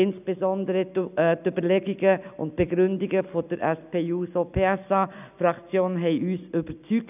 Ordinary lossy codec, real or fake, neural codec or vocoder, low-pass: none; real; none; 3.6 kHz